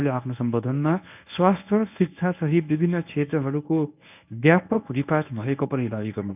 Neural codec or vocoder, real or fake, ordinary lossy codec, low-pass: codec, 24 kHz, 0.9 kbps, WavTokenizer, medium speech release version 1; fake; none; 3.6 kHz